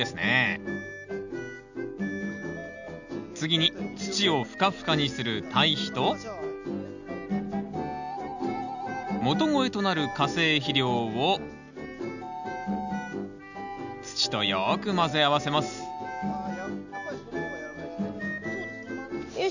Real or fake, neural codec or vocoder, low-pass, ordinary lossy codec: real; none; 7.2 kHz; none